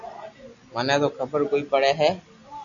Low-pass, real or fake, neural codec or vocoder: 7.2 kHz; real; none